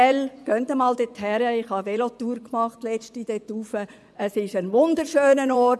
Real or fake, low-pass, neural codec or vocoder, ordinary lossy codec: fake; none; vocoder, 24 kHz, 100 mel bands, Vocos; none